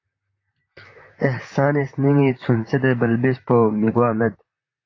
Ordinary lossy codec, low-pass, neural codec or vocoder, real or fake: AAC, 32 kbps; 7.2 kHz; vocoder, 44.1 kHz, 128 mel bands, Pupu-Vocoder; fake